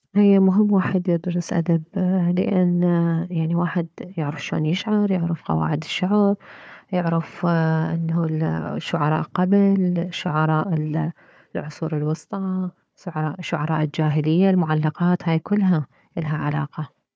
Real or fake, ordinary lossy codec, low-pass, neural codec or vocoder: fake; none; none; codec, 16 kHz, 4 kbps, FunCodec, trained on Chinese and English, 50 frames a second